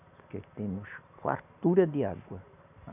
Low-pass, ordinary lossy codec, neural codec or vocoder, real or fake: 3.6 kHz; none; none; real